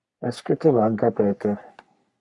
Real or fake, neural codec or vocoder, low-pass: fake; codec, 44.1 kHz, 3.4 kbps, Pupu-Codec; 10.8 kHz